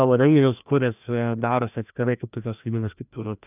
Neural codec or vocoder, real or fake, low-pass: codec, 16 kHz, 1 kbps, FreqCodec, larger model; fake; 3.6 kHz